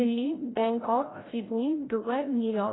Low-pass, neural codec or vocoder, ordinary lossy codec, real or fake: 7.2 kHz; codec, 16 kHz, 0.5 kbps, FreqCodec, larger model; AAC, 16 kbps; fake